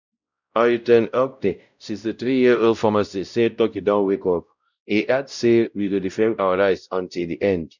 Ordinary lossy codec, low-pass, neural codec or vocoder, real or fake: none; 7.2 kHz; codec, 16 kHz, 0.5 kbps, X-Codec, WavLM features, trained on Multilingual LibriSpeech; fake